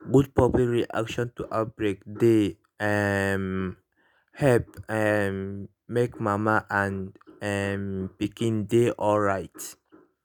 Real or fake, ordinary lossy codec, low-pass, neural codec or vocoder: real; none; none; none